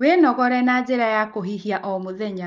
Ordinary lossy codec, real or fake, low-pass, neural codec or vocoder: Opus, 32 kbps; real; 7.2 kHz; none